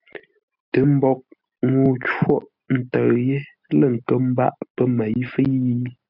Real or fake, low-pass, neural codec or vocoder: real; 5.4 kHz; none